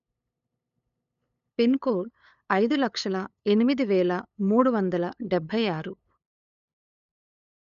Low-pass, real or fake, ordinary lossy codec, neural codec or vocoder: 7.2 kHz; fake; none; codec, 16 kHz, 8 kbps, FunCodec, trained on LibriTTS, 25 frames a second